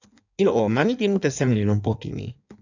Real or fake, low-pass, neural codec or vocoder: fake; 7.2 kHz; codec, 16 kHz in and 24 kHz out, 1.1 kbps, FireRedTTS-2 codec